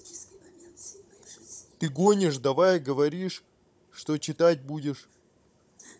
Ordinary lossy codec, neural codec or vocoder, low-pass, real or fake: none; codec, 16 kHz, 16 kbps, FunCodec, trained on Chinese and English, 50 frames a second; none; fake